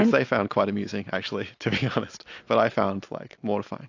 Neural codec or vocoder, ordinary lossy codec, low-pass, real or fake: none; AAC, 48 kbps; 7.2 kHz; real